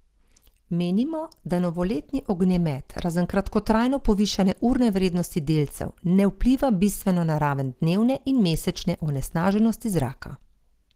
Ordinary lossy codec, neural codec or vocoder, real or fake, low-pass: Opus, 16 kbps; none; real; 14.4 kHz